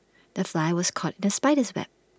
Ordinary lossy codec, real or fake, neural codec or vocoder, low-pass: none; real; none; none